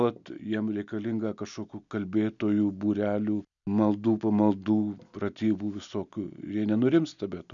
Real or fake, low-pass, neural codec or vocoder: real; 7.2 kHz; none